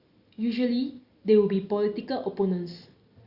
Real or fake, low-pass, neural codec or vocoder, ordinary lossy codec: real; 5.4 kHz; none; Opus, 64 kbps